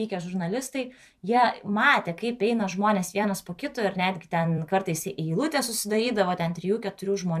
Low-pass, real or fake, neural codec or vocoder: 14.4 kHz; fake; vocoder, 44.1 kHz, 128 mel bands every 256 samples, BigVGAN v2